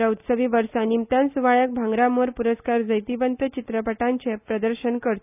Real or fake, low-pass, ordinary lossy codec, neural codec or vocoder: real; 3.6 kHz; none; none